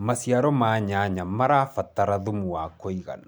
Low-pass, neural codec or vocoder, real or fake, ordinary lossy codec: none; none; real; none